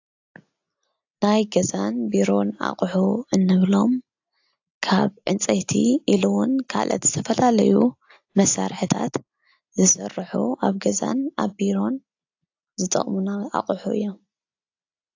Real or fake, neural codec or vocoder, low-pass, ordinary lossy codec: real; none; 7.2 kHz; AAC, 48 kbps